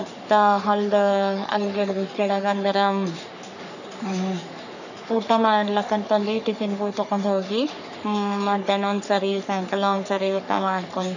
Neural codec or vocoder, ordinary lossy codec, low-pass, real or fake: codec, 44.1 kHz, 3.4 kbps, Pupu-Codec; none; 7.2 kHz; fake